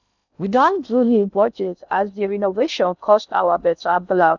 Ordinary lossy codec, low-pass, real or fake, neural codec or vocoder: none; 7.2 kHz; fake; codec, 16 kHz in and 24 kHz out, 0.6 kbps, FocalCodec, streaming, 2048 codes